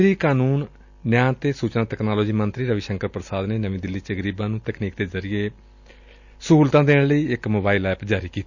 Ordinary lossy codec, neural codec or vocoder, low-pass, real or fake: none; none; 7.2 kHz; real